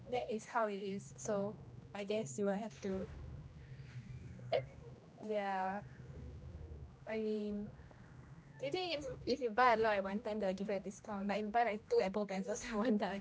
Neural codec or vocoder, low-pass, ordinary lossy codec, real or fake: codec, 16 kHz, 1 kbps, X-Codec, HuBERT features, trained on general audio; none; none; fake